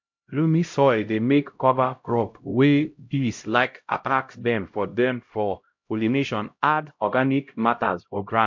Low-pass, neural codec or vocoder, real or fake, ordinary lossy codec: 7.2 kHz; codec, 16 kHz, 0.5 kbps, X-Codec, HuBERT features, trained on LibriSpeech; fake; MP3, 48 kbps